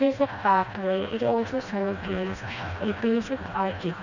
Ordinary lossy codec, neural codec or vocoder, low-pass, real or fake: none; codec, 16 kHz, 1 kbps, FreqCodec, smaller model; 7.2 kHz; fake